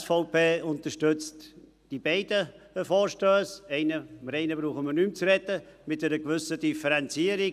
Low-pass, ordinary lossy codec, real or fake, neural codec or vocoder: 14.4 kHz; none; real; none